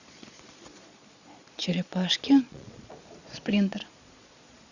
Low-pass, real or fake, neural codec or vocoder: 7.2 kHz; fake; vocoder, 22.05 kHz, 80 mel bands, Vocos